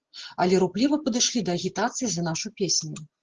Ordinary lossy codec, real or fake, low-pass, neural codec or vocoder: Opus, 16 kbps; real; 7.2 kHz; none